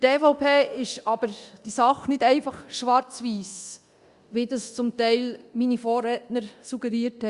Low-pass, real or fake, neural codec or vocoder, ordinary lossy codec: 10.8 kHz; fake; codec, 24 kHz, 0.9 kbps, DualCodec; Opus, 64 kbps